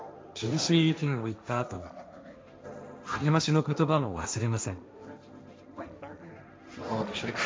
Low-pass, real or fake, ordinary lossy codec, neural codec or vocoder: none; fake; none; codec, 16 kHz, 1.1 kbps, Voila-Tokenizer